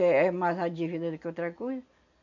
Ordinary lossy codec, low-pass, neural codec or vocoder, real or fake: none; 7.2 kHz; none; real